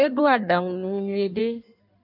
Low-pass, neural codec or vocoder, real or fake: 5.4 kHz; codec, 16 kHz in and 24 kHz out, 1.1 kbps, FireRedTTS-2 codec; fake